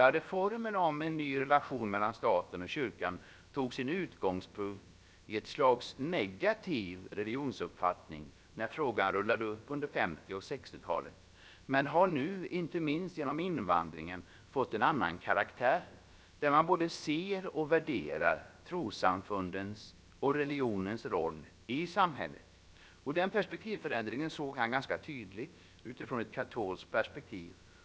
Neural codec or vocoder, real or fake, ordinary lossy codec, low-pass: codec, 16 kHz, about 1 kbps, DyCAST, with the encoder's durations; fake; none; none